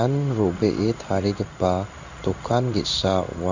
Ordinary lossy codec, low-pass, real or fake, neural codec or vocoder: none; 7.2 kHz; fake; codec, 16 kHz in and 24 kHz out, 1 kbps, XY-Tokenizer